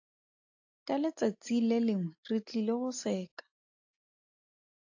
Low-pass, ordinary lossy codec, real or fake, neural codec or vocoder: 7.2 kHz; AAC, 48 kbps; real; none